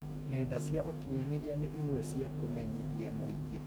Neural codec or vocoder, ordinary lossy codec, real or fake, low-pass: codec, 44.1 kHz, 2.6 kbps, DAC; none; fake; none